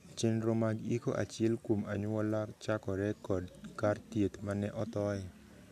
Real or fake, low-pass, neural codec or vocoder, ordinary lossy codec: real; 14.4 kHz; none; none